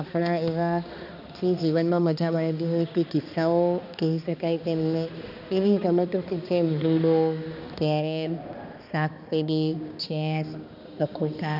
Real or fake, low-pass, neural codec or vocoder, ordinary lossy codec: fake; 5.4 kHz; codec, 16 kHz, 2 kbps, X-Codec, HuBERT features, trained on balanced general audio; none